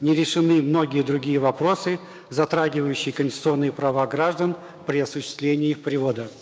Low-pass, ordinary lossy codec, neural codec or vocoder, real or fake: none; none; codec, 16 kHz, 6 kbps, DAC; fake